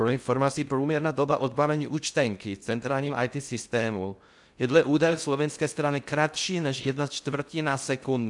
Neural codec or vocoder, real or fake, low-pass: codec, 16 kHz in and 24 kHz out, 0.6 kbps, FocalCodec, streaming, 2048 codes; fake; 10.8 kHz